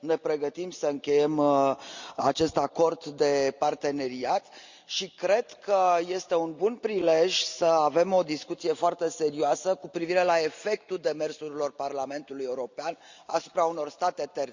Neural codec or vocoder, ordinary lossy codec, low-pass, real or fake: none; Opus, 64 kbps; 7.2 kHz; real